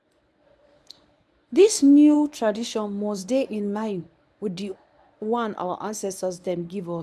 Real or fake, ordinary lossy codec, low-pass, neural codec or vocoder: fake; none; none; codec, 24 kHz, 0.9 kbps, WavTokenizer, medium speech release version 1